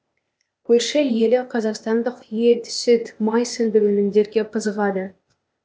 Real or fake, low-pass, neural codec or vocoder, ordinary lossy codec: fake; none; codec, 16 kHz, 0.8 kbps, ZipCodec; none